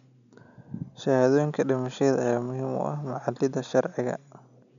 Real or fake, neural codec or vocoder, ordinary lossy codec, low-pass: real; none; none; 7.2 kHz